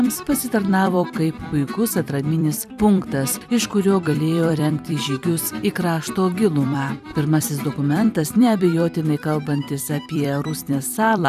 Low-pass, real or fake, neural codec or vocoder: 14.4 kHz; fake; vocoder, 44.1 kHz, 128 mel bands every 256 samples, BigVGAN v2